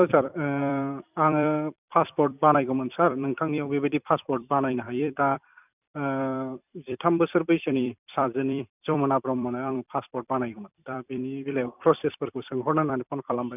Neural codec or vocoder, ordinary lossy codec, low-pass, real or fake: vocoder, 44.1 kHz, 128 mel bands every 256 samples, BigVGAN v2; none; 3.6 kHz; fake